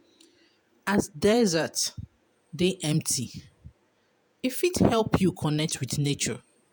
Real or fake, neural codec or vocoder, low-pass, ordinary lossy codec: fake; vocoder, 48 kHz, 128 mel bands, Vocos; none; none